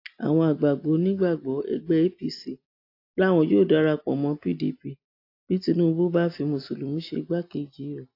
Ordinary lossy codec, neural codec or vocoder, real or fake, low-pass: AAC, 32 kbps; none; real; 5.4 kHz